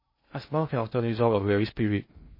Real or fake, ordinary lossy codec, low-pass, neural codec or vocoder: fake; MP3, 24 kbps; 5.4 kHz; codec, 16 kHz in and 24 kHz out, 0.6 kbps, FocalCodec, streaming, 2048 codes